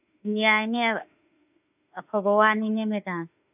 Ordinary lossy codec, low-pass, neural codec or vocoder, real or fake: none; 3.6 kHz; autoencoder, 48 kHz, 32 numbers a frame, DAC-VAE, trained on Japanese speech; fake